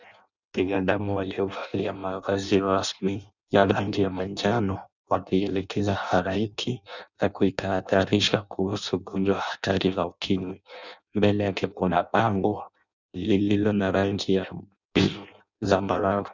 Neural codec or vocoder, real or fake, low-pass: codec, 16 kHz in and 24 kHz out, 0.6 kbps, FireRedTTS-2 codec; fake; 7.2 kHz